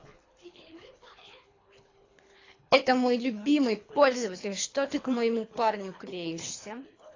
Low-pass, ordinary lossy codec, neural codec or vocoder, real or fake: 7.2 kHz; AAC, 32 kbps; codec, 24 kHz, 3 kbps, HILCodec; fake